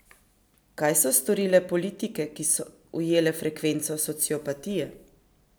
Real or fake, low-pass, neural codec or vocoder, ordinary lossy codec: real; none; none; none